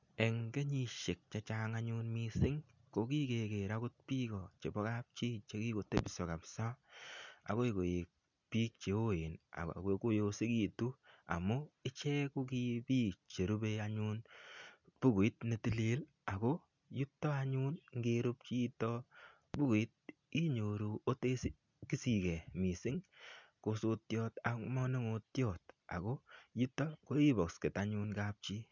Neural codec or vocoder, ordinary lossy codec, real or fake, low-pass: none; none; real; 7.2 kHz